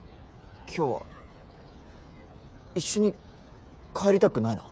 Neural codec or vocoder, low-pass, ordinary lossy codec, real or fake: codec, 16 kHz, 8 kbps, FreqCodec, smaller model; none; none; fake